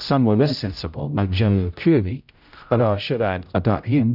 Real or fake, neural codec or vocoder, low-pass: fake; codec, 16 kHz, 0.5 kbps, X-Codec, HuBERT features, trained on general audio; 5.4 kHz